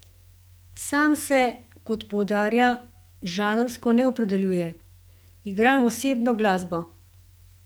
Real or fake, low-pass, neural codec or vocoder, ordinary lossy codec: fake; none; codec, 44.1 kHz, 2.6 kbps, SNAC; none